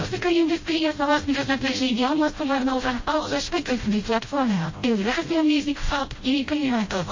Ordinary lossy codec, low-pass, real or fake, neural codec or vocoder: MP3, 32 kbps; 7.2 kHz; fake; codec, 16 kHz, 0.5 kbps, FreqCodec, smaller model